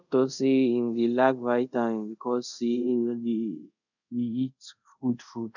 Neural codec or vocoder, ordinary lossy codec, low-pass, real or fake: codec, 24 kHz, 0.5 kbps, DualCodec; none; 7.2 kHz; fake